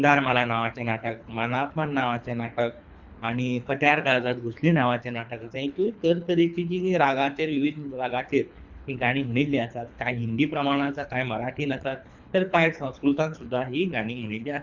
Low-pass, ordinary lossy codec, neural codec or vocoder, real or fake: 7.2 kHz; Opus, 64 kbps; codec, 24 kHz, 3 kbps, HILCodec; fake